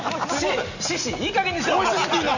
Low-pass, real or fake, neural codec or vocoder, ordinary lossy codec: 7.2 kHz; real; none; none